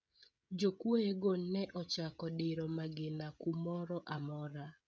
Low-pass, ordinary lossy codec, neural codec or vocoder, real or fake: none; none; codec, 16 kHz, 16 kbps, FreqCodec, smaller model; fake